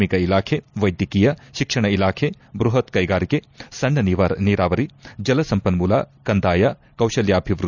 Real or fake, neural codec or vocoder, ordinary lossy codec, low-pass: real; none; none; 7.2 kHz